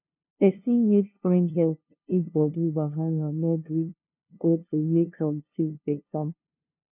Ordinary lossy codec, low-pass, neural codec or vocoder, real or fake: none; 3.6 kHz; codec, 16 kHz, 0.5 kbps, FunCodec, trained on LibriTTS, 25 frames a second; fake